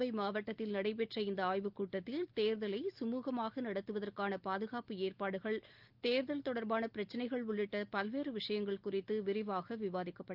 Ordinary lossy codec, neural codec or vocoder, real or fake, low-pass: Opus, 32 kbps; none; real; 5.4 kHz